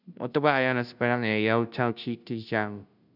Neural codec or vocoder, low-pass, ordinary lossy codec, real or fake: codec, 16 kHz, 0.5 kbps, FunCodec, trained on Chinese and English, 25 frames a second; 5.4 kHz; none; fake